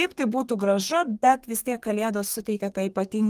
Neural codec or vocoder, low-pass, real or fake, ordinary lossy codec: codec, 32 kHz, 1.9 kbps, SNAC; 14.4 kHz; fake; Opus, 24 kbps